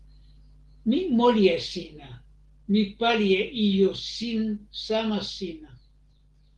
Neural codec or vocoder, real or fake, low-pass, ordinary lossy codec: none; real; 10.8 kHz; Opus, 16 kbps